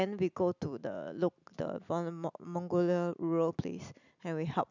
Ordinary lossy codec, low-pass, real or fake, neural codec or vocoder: none; 7.2 kHz; real; none